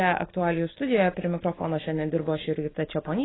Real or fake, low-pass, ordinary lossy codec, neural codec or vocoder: real; 7.2 kHz; AAC, 16 kbps; none